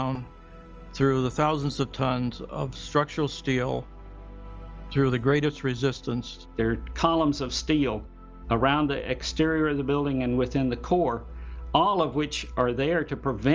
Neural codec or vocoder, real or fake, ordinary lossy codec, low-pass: none; real; Opus, 24 kbps; 7.2 kHz